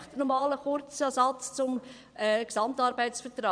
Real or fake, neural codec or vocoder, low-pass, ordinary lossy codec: fake; vocoder, 22.05 kHz, 80 mel bands, Vocos; 9.9 kHz; none